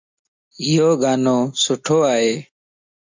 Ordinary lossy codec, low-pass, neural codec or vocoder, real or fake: MP3, 64 kbps; 7.2 kHz; none; real